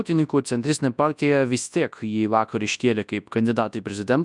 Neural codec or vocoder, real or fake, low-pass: codec, 24 kHz, 0.9 kbps, WavTokenizer, large speech release; fake; 10.8 kHz